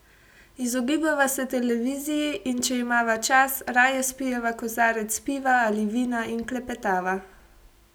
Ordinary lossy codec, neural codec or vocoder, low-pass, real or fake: none; none; none; real